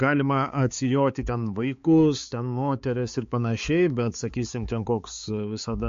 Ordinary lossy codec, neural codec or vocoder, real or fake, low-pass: MP3, 48 kbps; codec, 16 kHz, 4 kbps, X-Codec, HuBERT features, trained on balanced general audio; fake; 7.2 kHz